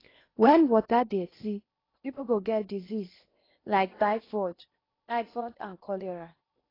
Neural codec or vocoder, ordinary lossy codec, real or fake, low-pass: codec, 16 kHz in and 24 kHz out, 0.8 kbps, FocalCodec, streaming, 65536 codes; AAC, 24 kbps; fake; 5.4 kHz